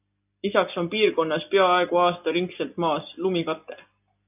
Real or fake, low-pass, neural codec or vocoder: real; 3.6 kHz; none